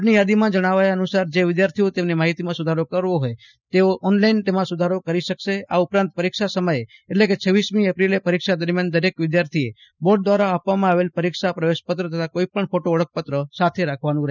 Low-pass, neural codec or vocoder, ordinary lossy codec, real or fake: 7.2 kHz; none; none; real